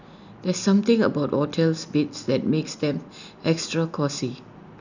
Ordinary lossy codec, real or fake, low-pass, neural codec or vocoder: none; real; 7.2 kHz; none